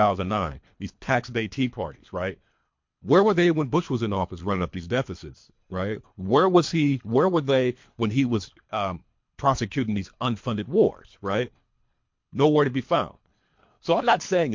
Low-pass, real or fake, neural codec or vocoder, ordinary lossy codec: 7.2 kHz; fake; codec, 24 kHz, 3 kbps, HILCodec; MP3, 48 kbps